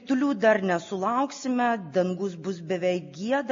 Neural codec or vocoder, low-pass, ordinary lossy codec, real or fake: none; 7.2 kHz; MP3, 32 kbps; real